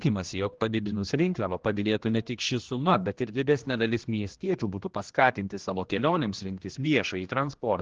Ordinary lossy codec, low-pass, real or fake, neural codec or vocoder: Opus, 16 kbps; 7.2 kHz; fake; codec, 16 kHz, 1 kbps, X-Codec, HuBERT features, trained on general audio